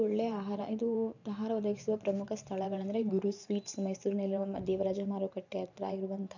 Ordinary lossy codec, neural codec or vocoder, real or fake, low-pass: none; vocoder, 44.1 kHz, 128 mel bands every 512 samples, BigVGAN v2; fake; 7.2 kHz